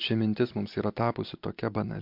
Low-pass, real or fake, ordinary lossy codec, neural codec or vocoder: 5.4 kHz; real; MP3, 48 kbps; none